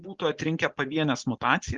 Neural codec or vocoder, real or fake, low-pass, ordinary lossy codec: none; real; 7.2 kHz; Opus, 32 kbps